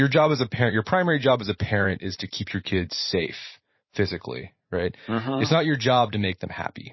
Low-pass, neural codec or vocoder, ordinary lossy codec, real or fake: 7.2 kHz; vocoder, 44.1 kHz, 128 mel bands every 512 samples, BigVGAN v2; MP3, 24 kbps; fake